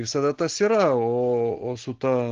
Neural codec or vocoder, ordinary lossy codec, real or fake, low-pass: none; Opus, 16 kbps; real; 7.2 kHz